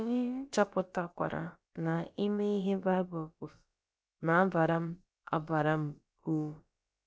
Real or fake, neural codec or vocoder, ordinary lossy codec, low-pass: fake; codec, 16 kHz, about 1 kbps, DyCAST, with the encoder's durations; none; none